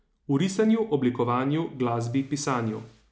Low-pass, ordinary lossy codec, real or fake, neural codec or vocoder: none; none; real; none